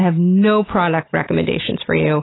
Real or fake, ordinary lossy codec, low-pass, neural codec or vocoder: real; AAC, 16 kbps; 7.2 kHz; none